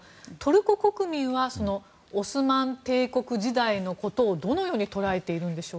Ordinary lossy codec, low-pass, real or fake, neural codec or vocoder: none; none; real; none